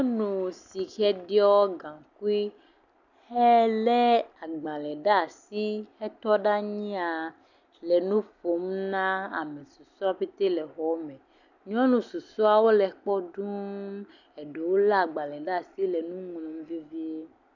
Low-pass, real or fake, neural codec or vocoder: 7.2 kHz; real; none